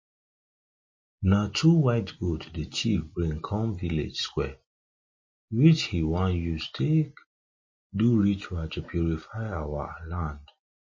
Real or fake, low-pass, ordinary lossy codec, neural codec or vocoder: real; 7.2 kHz; MP3, 32 kbps; none